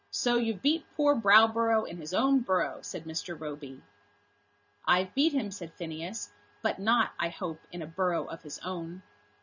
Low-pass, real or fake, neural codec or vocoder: 7.2 kHz; real; none